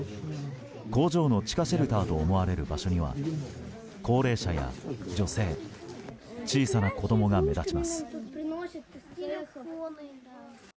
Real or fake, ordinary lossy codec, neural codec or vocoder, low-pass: real; none; none; none